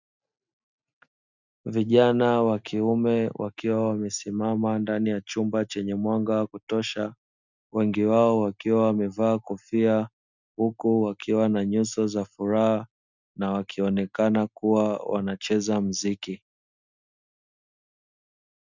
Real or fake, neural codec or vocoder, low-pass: real; none; 7.2 kHz